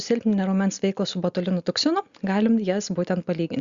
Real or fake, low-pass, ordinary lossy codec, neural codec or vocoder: real; 7.2 kHz; Opus, 64 kbps; none